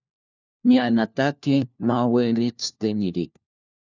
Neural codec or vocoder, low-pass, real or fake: codec, 16 kHz, 1 kbps, FunCodec, trained on LibriTTS, 50 frames a second; 7.2 kHz; fake